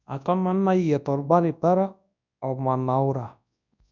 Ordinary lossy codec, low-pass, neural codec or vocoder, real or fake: none; 7.2 kHz; codec, 24 kHz, 0.9 kbps, WavTokenizer, large speech release; fake